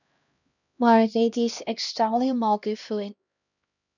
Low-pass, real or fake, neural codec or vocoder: 7.2 kHz; fake; codec, 16 kHz, 1 kbps, X-Codec, HuBERT features, trained on LibriSpeech